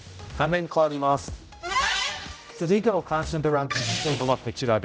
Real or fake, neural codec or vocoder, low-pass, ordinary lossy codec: fake; codec, 16 kHz, 0.5 kbps, X-Codec, HuBERT features, trained on general audio; none; none